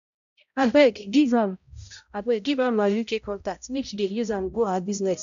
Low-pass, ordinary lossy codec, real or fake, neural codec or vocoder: 7.2 kHz; none; fake; codec, 16 kHz, 0.5 kbps, X-Codec, HuBERT features, trained on balanced general audio